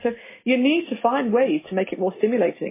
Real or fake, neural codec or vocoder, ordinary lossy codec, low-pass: real; none; MP3, 16 kbps; 3.6 kHz